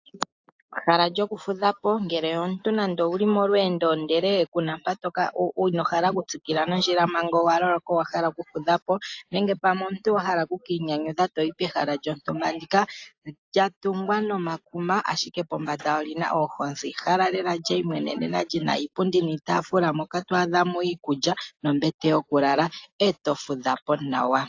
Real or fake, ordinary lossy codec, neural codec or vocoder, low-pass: real; AAC, 48 kbps; none; 7.2 kHz